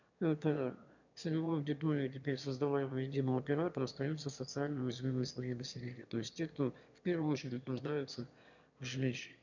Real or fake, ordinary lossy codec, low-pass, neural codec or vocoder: fake; none; 7.2 kHz; autoencoder, 22.05 kHz, a latent of 192 numbers a frame, VITS, trained on one speaker